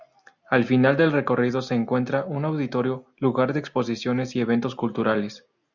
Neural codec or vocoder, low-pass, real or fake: none; 7.2 kHz; real